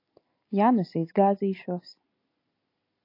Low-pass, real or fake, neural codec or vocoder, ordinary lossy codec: 5.4 kHz; real; none; AAC, 32 kbps